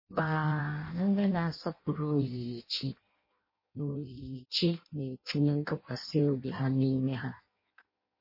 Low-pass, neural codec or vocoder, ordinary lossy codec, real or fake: 5.4 kHz; codec, 16 kHz in and 24 kHz out, 0.6 kbps, FireRedTTS-2 codec; MP3, 24 kbps; fake